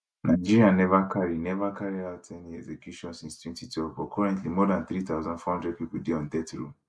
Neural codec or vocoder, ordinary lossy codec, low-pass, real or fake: none; none; none; real